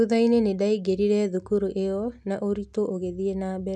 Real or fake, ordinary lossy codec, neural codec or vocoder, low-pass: real; none; none; none